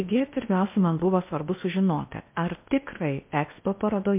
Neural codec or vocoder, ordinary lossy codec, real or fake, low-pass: codec, 16 kHz in and 24 kHz out, 0.8 kbps, FocalCodec, streaming, 65536 codes; MP3, 32 kbps; fake; 3.6 kHz